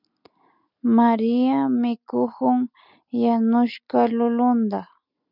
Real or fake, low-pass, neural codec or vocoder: real; 5.4 kHz; none